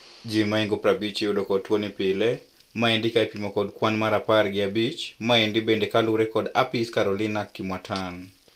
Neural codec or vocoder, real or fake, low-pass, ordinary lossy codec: none; real; 14.4 kHz; Opus, 32 kbps